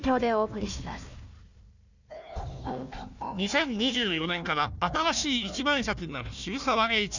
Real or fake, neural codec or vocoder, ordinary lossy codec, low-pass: fake; codec, 16 kHz, 1 kbps, FunCodec, trained on Chinese and English, 50 frames a second; none; 7.2 kHz